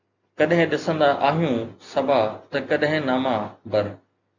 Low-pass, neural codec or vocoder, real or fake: 7.2 kHz; none; real